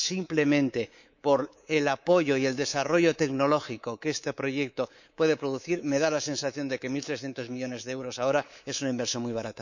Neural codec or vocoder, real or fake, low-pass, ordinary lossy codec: codec, 24 kHz, 3.1 kbps, DualCodec; fake; 7.2 kHz; none